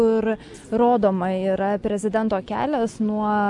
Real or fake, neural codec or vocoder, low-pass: real; none; 10.8 kHz